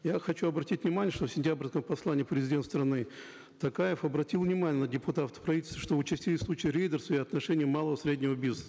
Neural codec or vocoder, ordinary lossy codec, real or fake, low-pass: none; none; real; none